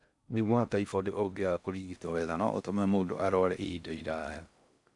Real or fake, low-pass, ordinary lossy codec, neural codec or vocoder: fake; 10.8 kHz; none; codec, 16 kHz in and 24 kHz out, 0.6 kbps, FocalCodec, streaming, 4096 codes